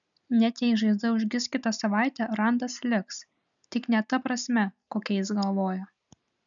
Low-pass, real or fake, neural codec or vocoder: 7.2 kHz; real; none